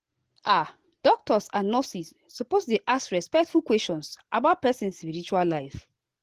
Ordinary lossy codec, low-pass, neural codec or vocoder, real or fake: Opus, 16 kbps; 14.4 kHz; none; real